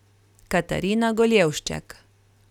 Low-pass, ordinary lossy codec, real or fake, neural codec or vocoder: 19.8 kHz; none; real; none